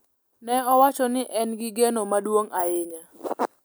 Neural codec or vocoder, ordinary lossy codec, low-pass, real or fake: none; none; none; real